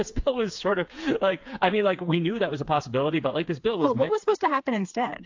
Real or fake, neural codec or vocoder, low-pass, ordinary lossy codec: fake; codec, 16 kHz, 4 kbps, FreqCodec, smaller model; 7.2 kHz; AAC, 48 kbps